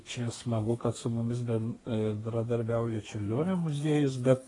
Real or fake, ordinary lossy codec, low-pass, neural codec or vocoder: fake; AAC, 32 kbps; 10.8 kHz; codec, 32 kHz, 1.9 kbps, SNAC